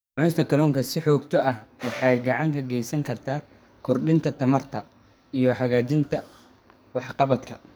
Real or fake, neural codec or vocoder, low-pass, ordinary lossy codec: fake; codec, 44.1 kHz, 2.6 kbps, SNAC; none; none